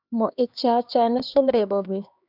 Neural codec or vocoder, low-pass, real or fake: codec, 16 kHz, 2 kbps, X-Codec, HuBERT features, trained on LibriSpeech; 5.4 kHz; fake